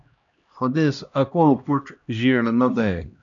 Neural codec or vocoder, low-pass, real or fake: codec, 16 kHz, 1 kbps, X-Codec, HuBERT features, trained on LibriSpeech; 7.2 kHz; fake